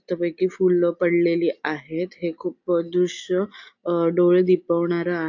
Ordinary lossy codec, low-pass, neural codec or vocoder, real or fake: AAC, 48 kbps; 7.2 kHz; none; real